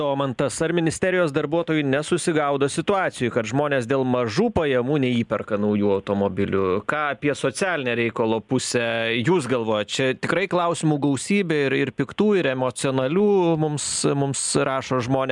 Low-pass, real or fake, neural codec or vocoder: 10.8 kHz; real; none